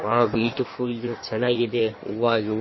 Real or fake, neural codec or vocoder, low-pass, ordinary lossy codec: fake; codec, 16 kHz in and 24 kHz out, 1.1 kbps, FireRedTTS-2 codec; 7.2 kHz; MP3, 24 kbps